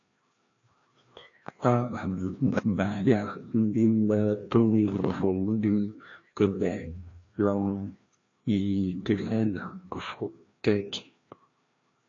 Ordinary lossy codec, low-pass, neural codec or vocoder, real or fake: AAC, 48 kbps; 7.2 kHz; codec, 16 kHz, 1 kbps, FreqCodec, larger model; fake